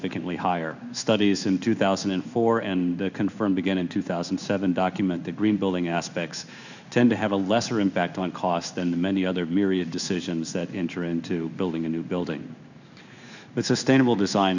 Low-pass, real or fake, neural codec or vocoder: 7.2 kHz; fake; codec, 16 kHz in and 24 kHz out, 1 kbps, XY-Tokenizer